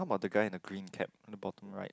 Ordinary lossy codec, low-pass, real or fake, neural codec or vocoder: none; none; real; none